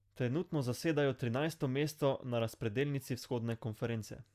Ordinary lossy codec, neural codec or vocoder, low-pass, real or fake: Opus, 64 kbps; none; 14.4 kHz; real